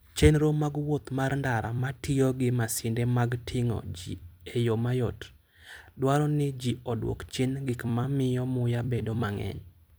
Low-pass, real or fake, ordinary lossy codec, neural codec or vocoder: none; fake; none; vocoder, 44.1 kHz, 128 mel bands every 256 samples, BigVGAN v2